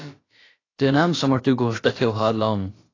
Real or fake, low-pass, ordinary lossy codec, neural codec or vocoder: fake; 7.2 kHz; AAC, 32 kbps; codec, 16 kHz, about 1 kbps, DyCAST, with the encoder's durations